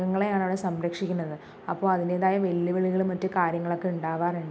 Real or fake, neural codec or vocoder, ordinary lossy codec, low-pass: real; none; none; none